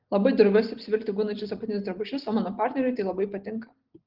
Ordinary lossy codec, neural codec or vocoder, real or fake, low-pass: Opus, 16 kbps; none; real; 5.4 kHz